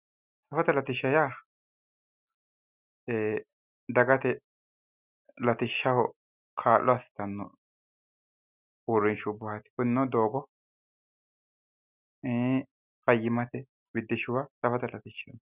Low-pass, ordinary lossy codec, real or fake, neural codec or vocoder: 3.6 kHz; Opus, 64 kbps; real; none